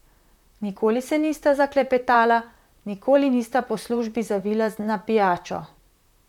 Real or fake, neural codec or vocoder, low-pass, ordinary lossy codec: fake; vocoder, 44.1 kHz, 128 mel bands, Pupu-Vocoder; 19.8 kHz; none